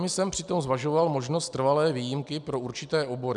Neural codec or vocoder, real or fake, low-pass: none; real; 10.8 kHz